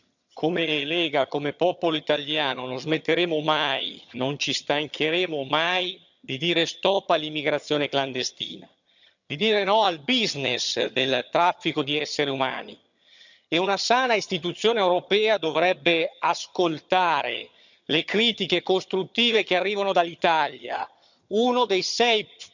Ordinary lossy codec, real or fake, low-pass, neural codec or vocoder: none; fake; 7.2 kHz; vocoder, 22.05 kHz, 80 mel bands, HiFi-GAN